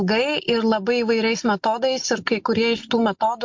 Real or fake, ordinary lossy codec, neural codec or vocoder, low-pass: real; MP3, 48 kbps; none; 7.2 kHz